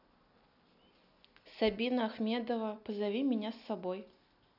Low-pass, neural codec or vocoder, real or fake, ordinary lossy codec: 5.4 kHz; none; real; none